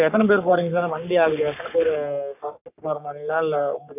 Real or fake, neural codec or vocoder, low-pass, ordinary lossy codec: fake; codec, 44.1 kHz, 3.4 kbps, Pupu-Codec; 3.6 kHz; none